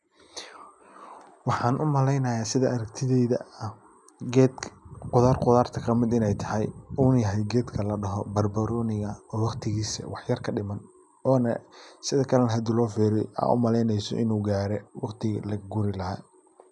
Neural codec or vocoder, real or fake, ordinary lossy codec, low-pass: none; real; none; 10.8 kHz